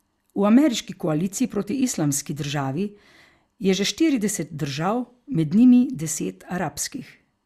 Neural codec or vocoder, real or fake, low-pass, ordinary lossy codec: none; real; 14.4 kHz; Opus, 64 kbps